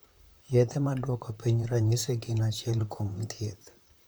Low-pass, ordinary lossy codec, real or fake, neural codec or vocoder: none; none; fake; vocoder, 44.1 kHz, 128 mel bands, Pupu-Vocoder